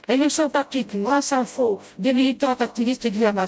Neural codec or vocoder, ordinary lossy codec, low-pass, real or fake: codec, 16 kHz, 0.5 kbps, FreqCodec, smaller model; none; none; fake